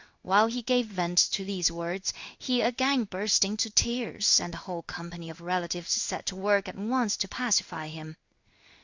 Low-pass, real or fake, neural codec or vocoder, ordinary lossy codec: 7.2 kHz; fake; codec, 16 kHz in and 24 kHz out, 1 kbps, XY-Tokenizer; Opus, 64 kbps